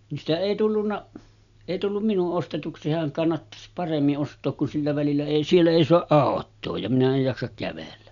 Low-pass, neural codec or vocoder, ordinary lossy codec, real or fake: 7.2 kHz; none; none; real